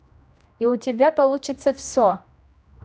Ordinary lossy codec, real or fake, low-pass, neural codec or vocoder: none; fake; none; codec, 16 kHz, 1 kbps, X-Codec, HuBERT features, trained on general audio